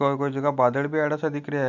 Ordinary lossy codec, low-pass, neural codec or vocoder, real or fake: none; 7.2 kHz; none; real